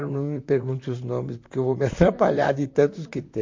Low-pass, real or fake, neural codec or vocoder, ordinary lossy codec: 7.2 kHz; fake; vocoder, 44.1 kHz, 128 mel bands, Pupu-Vocoder; MP3, 48 kbps